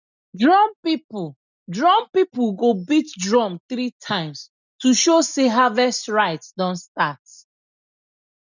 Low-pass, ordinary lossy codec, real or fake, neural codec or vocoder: 7.2 kHz; none; real; none